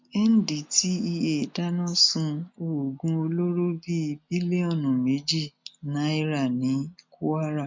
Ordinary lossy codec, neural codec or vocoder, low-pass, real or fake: MP3, 64 kbps; none; 7.2 kHz; real